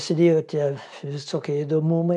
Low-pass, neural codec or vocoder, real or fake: 10.8 kHz; none; real